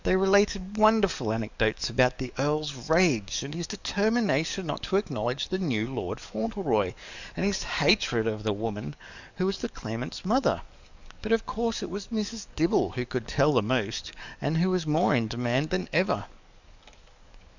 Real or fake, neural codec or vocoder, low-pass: fake; codec, 44.1 kHz, 7.8 kbps, DAC; 7.2 kHz